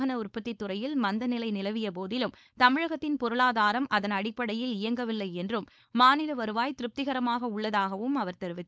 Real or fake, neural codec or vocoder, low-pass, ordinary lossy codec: fake; codec, 16 kHz, 4.8 kbps, FACodec; none; none